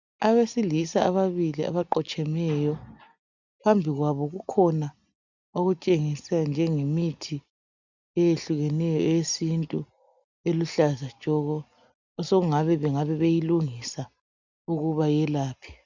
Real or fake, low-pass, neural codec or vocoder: real; 7.2 kHz; none